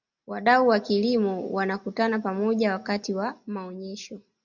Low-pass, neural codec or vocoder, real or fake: 7.2 kHz; none; real